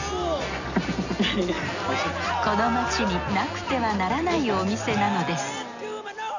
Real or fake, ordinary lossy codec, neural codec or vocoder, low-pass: real; none; none; 7.2 kHz